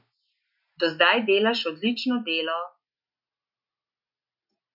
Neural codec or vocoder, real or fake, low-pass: none; real; 5.4 kHz